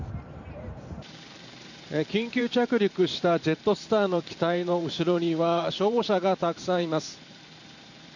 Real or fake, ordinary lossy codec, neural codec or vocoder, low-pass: fake; none; vocoder, 44.1 kHz, 128 mel bands every 512 samples, BigVGAN v2; 7.2 kHz